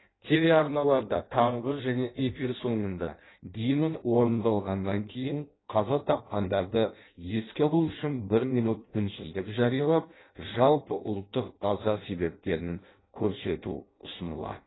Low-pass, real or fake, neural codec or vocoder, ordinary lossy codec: 7.2 kHz; fake; codec, 16 kHz in and 24 kHz out, 0.6 kbps, FireRedTTS-2 codec; AAC, 16 kbps